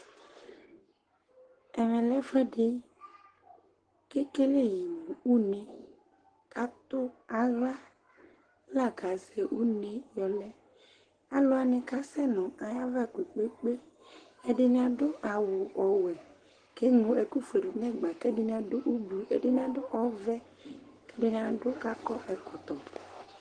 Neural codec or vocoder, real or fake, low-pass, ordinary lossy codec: codec, 44.1 kHz, 7.8 kbps, Pupu-Codec; fake; 9.9 kHz; Opus, 16 kbps